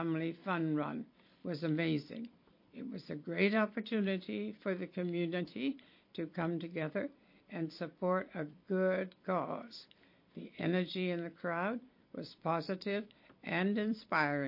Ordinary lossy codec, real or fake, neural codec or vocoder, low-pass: MP3, 32 kbps; fake; vocoder, 44.1 kHz, 128 mel bands every 256 samples, BigVGAN v2; 5.4 kHz